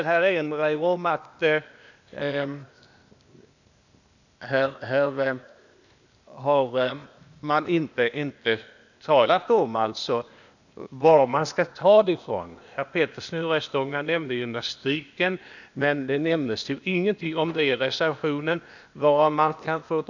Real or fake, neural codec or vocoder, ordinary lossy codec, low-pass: fake; codec, 16 kHz, 0.8 kbps, ZipCodec; none; 7.2 kHz